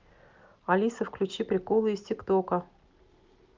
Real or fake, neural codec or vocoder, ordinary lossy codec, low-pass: real; none; Opus, 24 kbps; 7.2 kHz